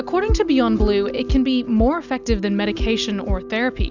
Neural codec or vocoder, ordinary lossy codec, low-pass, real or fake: none; Opus, 64 kbps; 7.2 kHz; real